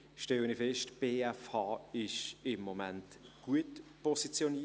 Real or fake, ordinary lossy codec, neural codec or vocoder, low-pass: real; none; none; none